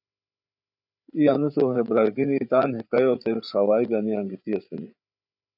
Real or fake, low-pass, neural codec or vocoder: fake; 5.4 kHz; codec, 16 kHz, 8 kbps, FreqCodec, larger model